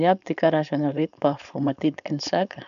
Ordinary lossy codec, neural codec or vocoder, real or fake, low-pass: none; codec, 16 kHz, 4.8 kbps, FACodec; fake; 7.2 kHz